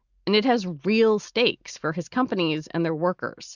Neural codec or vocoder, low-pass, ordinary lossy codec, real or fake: none; 7.2 kHz; Opus, 64 kbps; real